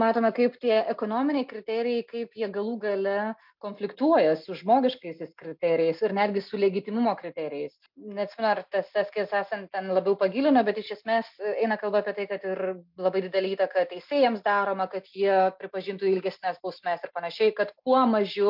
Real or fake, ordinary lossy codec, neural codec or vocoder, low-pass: real; MP3, 48 kbps; none; 5.4 kHz